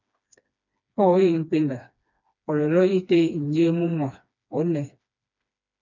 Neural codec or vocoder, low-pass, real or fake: codec, 16 kHz, 2 kbps, FreqCodec, smaller model; 7.2 kHz; fake